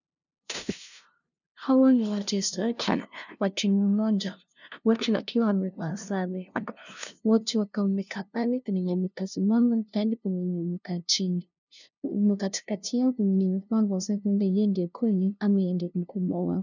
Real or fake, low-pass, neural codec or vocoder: fake; 7.2 kHz; codec, 16 kHz, 0.5 kbps, FunCodec, trained on LibriTTS, 25 frames a second